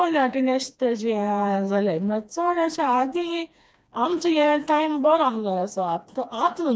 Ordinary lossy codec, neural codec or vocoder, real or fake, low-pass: none; codec, 16 kHz, 2 kbps, FreqCodec, smaller model; fake; none